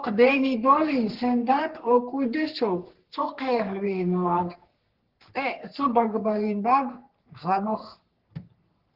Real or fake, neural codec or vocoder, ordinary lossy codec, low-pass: fake; codec, 44.1 kHz, 3.4 kbps, Pupu-Codec; Opus, 16 kbps; 5.4 kHz